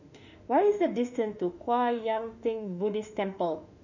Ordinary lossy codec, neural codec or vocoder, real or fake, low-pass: none; autoencoder, 48 kHz, 32 numbers a frame, DAC-VAE, trained on Japanese speech; fake; 7.2 kHz